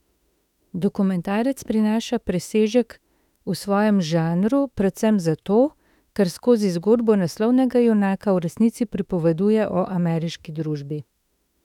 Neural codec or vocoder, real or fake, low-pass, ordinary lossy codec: autoencoder, 48 kHz, 32 numbers a frame, DAC-VAE, trained on Japanese speech; fake; 19.8 kHz; none